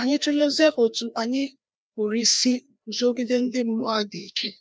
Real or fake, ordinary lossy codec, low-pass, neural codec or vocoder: fake; none; none; codec, 16 kHz, 1 kbps, FreqCodec, larger model